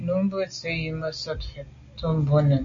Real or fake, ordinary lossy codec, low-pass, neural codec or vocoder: real; MP3, 48 kbps; 7.2 kHz; none